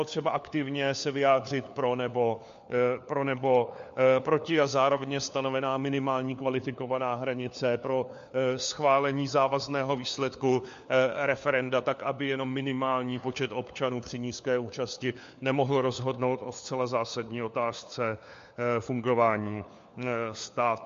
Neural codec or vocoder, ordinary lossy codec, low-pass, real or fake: codec, 16 kHz, 4 kbps, FunCodec, trained on LibriTTS, 50 frames a second; MP3, 48 kbps; 7.2 kHz; fake